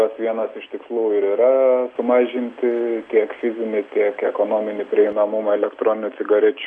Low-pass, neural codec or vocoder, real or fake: 10.8 kHz; none; real